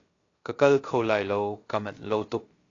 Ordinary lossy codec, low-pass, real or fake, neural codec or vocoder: AAC, 32 kbps; 7.2 kHz; fake; codec, 16 kHz, about 1 kbps, DyCAST, with the encoder's durations